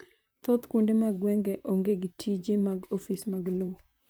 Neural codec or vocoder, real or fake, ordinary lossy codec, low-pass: vocoder, 44.1 kHz, 128 mel bands, Pupu-Vocoder; fake; none; none